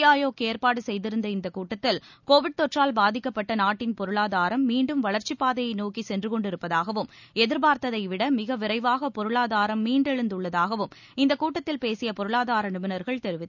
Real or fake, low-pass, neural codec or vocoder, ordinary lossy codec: real; 7.2 kHz; none; none